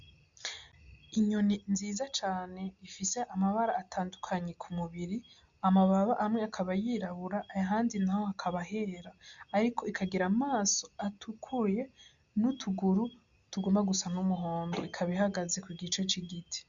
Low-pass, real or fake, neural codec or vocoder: 7.2 kHz; real; none